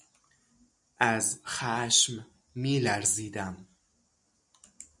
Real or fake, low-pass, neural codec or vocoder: real; 10.8 kHz; none